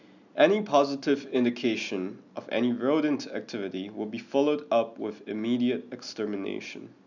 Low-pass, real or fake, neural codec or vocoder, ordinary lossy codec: 7.2 kHz; real; none; none